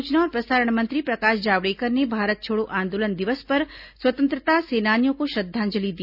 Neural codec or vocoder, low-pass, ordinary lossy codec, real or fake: none; 5.4 kHz; none; real